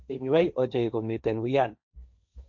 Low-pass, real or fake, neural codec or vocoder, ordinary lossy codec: 7.2 kHz; fake; codec, 16 kHz, 1.1 kbps, Voila-Tokenizer; none